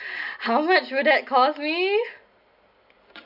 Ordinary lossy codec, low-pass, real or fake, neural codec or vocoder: none; 5.4 kHz; real; none